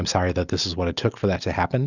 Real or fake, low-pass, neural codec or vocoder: real; 7.2 kHz; none